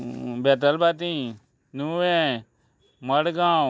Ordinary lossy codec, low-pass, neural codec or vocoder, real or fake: none; none; none; real